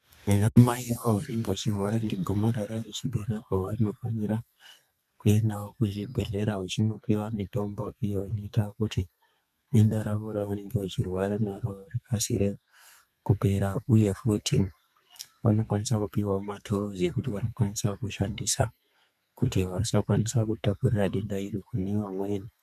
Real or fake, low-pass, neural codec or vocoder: fake; 14.4 kHz; codec, 32 kHz, 1.9 kbps, SNAC